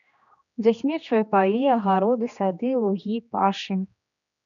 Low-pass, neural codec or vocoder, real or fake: 7.2 kHz; codec, 16 kHz, 2 kbps, X-Codec, HuBERT features, trained on general audio; fake